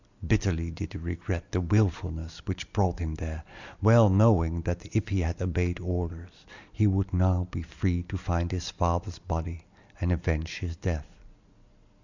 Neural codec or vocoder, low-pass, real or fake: none; 7.2 kHz; real